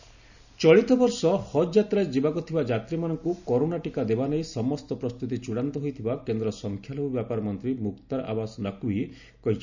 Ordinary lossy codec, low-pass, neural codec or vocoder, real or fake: none; 7.2 kHz; none; real